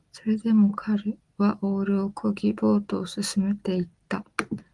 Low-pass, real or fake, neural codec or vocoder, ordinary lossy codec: 10.8 kHz; real; none; Opus, 24 kbps